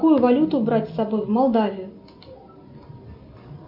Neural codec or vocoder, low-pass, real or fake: none; 5.4 kHz; real